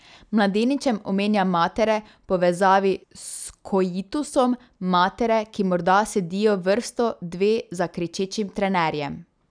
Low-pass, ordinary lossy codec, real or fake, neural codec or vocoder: 9.9 kHz; none; real; none